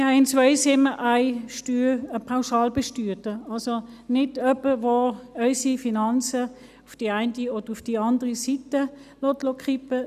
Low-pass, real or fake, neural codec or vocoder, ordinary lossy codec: 14.4 kHz; real; none; none